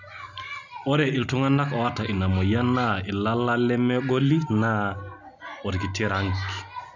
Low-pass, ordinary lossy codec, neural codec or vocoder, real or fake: 7.2 kHz; none; none; real